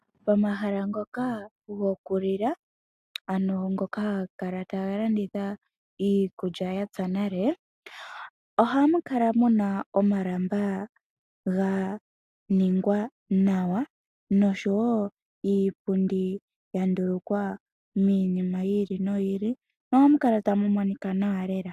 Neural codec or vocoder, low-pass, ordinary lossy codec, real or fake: none; 14.4 kHz; AAC, 96 kbps; real